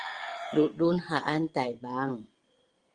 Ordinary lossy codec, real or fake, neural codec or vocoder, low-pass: Opus, 24 kbps; fake; vocoder, 22.05 kHz, 80 mel bands, WaveNeXt; 9.9 kHz